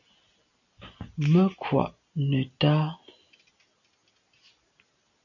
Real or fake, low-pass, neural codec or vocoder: real; 7.2 kHz; none